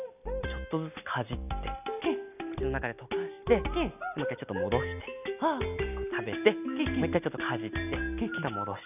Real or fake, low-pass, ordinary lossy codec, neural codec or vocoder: real; 3.6 kHz; none; none